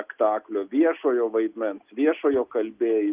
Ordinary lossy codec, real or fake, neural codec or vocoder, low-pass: Opus, 24 kbps; real; none; 3.6 kHz